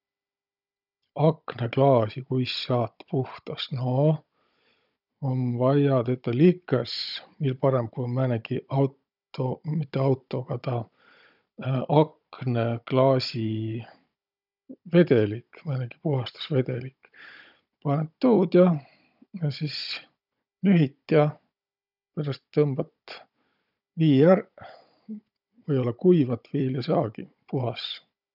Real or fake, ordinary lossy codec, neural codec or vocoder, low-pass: fake; none; codec, 16 kHz, 16 kbps, FunCodec, trained on Chinese and English, 50 frames a second; 5.4 kHz